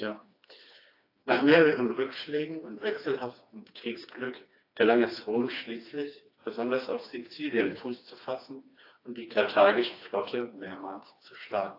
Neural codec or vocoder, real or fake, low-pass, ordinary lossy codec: codec, 16 kHz, 2 kbps, FreqCodec, smaller model; fake; 5.4 kHz; AAC, 32 kbps